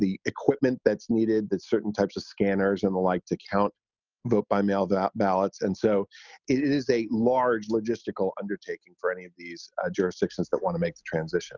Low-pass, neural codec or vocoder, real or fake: 7.2 kHz; none; real